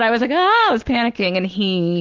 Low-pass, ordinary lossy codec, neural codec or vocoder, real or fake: 7.2 kHz; Opus, 16 kbps; none; real